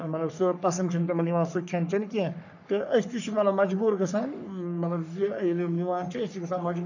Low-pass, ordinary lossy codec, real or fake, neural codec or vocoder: 7.2 kHz; none; fake; codec, 44.1 kHz, 3.4 kbps, Pupu-Codec